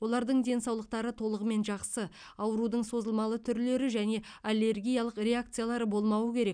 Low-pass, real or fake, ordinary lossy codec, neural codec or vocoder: 9.9 kHz; real; none; none